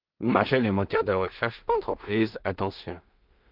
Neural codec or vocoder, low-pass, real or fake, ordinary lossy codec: codec, 16 kHz in and 24 kHz out, 0.4 kbps, LongCat-Audio-Codec, two codebook decoder; 5.4 kHz; fake; Opus, 24 kbps